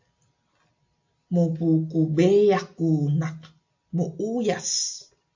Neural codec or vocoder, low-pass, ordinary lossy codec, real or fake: none; 7.2 kHz; MP3, 48 kbps; real